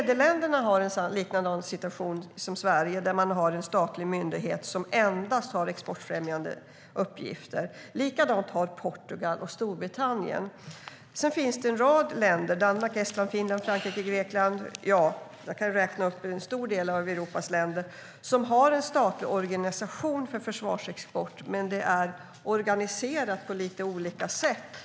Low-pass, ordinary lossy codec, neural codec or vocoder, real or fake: none; none; none; real